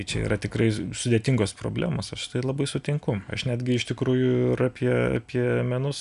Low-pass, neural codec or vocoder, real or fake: 10.8 kHz; none; real